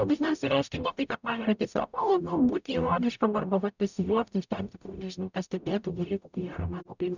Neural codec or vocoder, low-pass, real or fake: codec, 44.1 kHz, 0.9 kbps, DAC; 7.2 kHz; fake